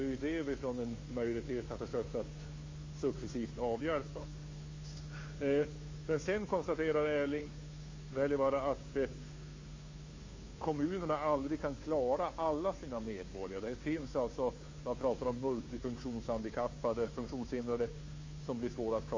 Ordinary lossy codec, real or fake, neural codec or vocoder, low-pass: MP3, 32 kbps; fake; codec, 16 kHz, 2 kbps, FunCodec, trained on Chinese and English, 25 frames a second; 7.2 kHz